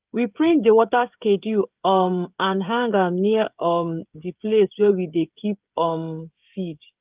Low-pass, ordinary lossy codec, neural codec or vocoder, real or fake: 3.6 kHz; Opus, 24 kbps; codec, 16 kHz, 16 kbps, FreqCodec, smaller model; fake